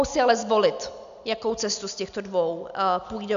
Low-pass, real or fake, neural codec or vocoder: 7.2 kHz; real; none